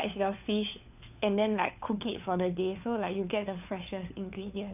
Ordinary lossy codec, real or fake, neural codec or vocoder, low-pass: none; fake; codec, 16 kHz, 4 kbps, FunCodec, trained on LibriTTS, 50 frames a second; 3.6 kHz